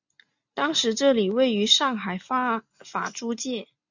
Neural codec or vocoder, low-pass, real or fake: none; 7.2 kHz; real